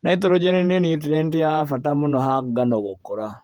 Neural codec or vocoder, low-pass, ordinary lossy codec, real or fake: vocoder, 48 kHz, 128 mel bands, Vocos; 19.8 kHz; Opus, 32 kbps; fake